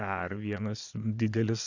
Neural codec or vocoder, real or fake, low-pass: vocoder, 22.05 kHz, 80 mel bands, Vocos; fake; 7.2 kHz